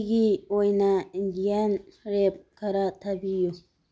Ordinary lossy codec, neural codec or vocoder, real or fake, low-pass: none; none; real; none